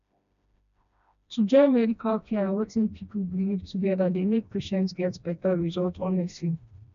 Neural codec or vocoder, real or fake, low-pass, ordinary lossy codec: codec, 16 kHz, 1 kbps, FreqCodec, smaller model; fake; 7.2 kHz; none